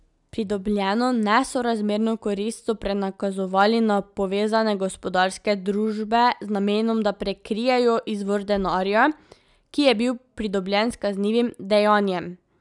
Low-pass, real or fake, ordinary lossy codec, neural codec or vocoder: 10.8 kHz; real; none; none